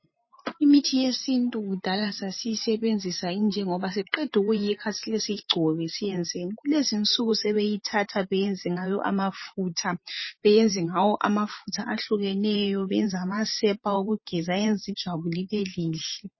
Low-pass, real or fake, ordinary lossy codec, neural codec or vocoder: 7.2 kHz; fake; MP3, 24 kbps; vocoder, 44.1 kHz, 128 mel bands every 512 samples, BigVGAN v2